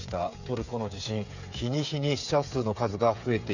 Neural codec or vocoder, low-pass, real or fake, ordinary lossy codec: codec, 16 kHz, 8 kbps, FreqCodec, smaller model; 7.2 kHz; fake; none